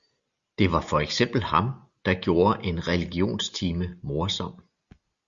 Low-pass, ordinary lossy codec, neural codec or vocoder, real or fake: 7.2 kHz; Opus, 64 kbps; none; real